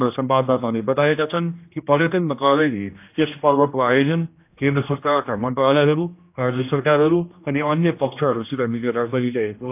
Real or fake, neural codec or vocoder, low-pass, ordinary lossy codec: fake; codec, 16 kHz, 1 kbps, X-Codec, HuBERT features, trained on general audio; 3.6 kHz; AAC, 32 kbps